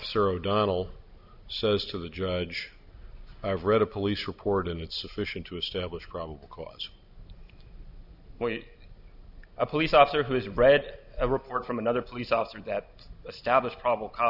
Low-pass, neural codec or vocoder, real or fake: 5.4 kHz; none; real